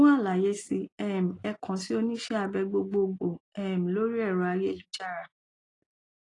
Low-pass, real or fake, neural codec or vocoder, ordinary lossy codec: 10.8 kHz; real; none; AAC, 32 kbps